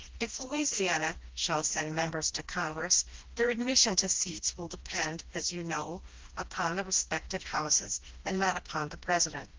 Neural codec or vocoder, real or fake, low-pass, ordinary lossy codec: codec, 16 kHz, 1 kbps, FreqCodec, smaller model; fake; 7.2 kHz; Opus, 32 kbps